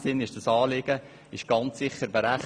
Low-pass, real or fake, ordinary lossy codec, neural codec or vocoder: 9.9 kHz; real; none; none